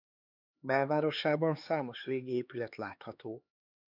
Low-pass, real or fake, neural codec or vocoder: 5.4 kHz; fake; codec, 16 kHz, 4 kbps, X-Codec, HuBERT features, trained on LibriSpeech